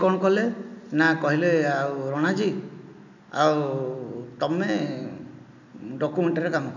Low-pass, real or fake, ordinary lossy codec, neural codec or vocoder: 7.2 kHz; real; none; none